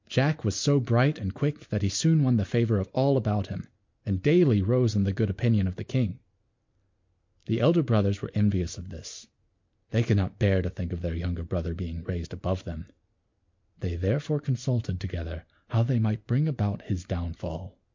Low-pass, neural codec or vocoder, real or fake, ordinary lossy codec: 7.2 kHz; none; real; MP3, 48 kbps